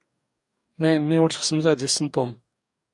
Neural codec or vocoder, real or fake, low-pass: codec, 44.1 kHz, 2.6 kbps, DAC; fake; 10.8 kHz